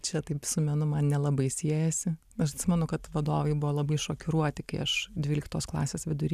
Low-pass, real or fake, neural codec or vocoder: 14.4 kHz; real; none